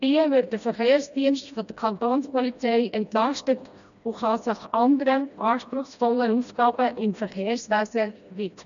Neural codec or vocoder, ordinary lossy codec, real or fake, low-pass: codec, 16 kHz, 1 kbps, FreqCodec, smaller model; none; fake; 7.2 kHz